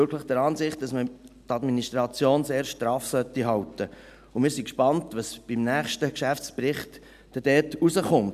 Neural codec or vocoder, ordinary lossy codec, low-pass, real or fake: none; none; 14.4 kHz; real